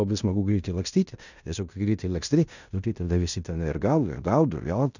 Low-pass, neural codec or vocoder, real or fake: 7.2 kHz; codec, 16 kHz in and 24 kHz out, 0.9 kbps, LongCat-Audio-Codec, fine tuned four codebook decoder; fake